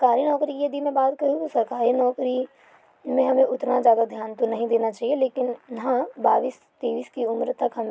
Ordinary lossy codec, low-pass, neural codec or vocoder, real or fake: none; none; none; real